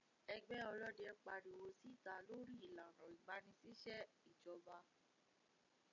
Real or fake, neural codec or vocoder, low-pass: real; none; 7.2 kHz